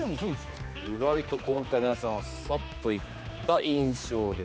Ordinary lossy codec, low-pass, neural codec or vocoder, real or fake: none; none; codec, 16 kHz, 2 kbps, X-Codec, HuBERT features, trained on general audio; fake